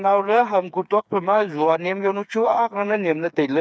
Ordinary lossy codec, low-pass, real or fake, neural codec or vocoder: none; none; fake; codec, 16 kHz, 4 kbps, FreqCodec, smaller model